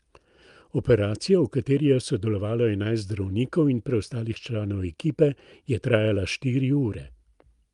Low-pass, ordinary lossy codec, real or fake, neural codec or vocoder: 10.8 kHz; Opus, 32 kbps; real; none